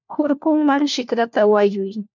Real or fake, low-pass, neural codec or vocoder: fake; 7.2 kHz; codec, 16 kHz, 1 kbps, FunCodec, trained on LibriTTS, 50 frames a second